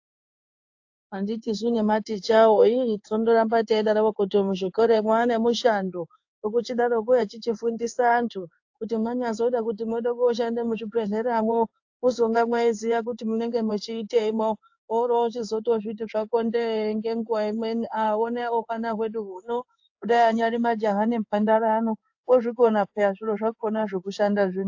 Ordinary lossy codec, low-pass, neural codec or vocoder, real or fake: AAC, 48 kbps; 7.2 kHz; codec, 16 kHz in and 24 kHz out, 1 kbps, XY-Tokenizer; fake